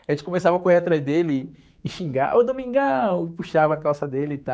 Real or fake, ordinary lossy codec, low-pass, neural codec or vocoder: fake; none; none; codec, 16 kHz, 4 kbps, X-Codec, HuBERT features, trained on general audio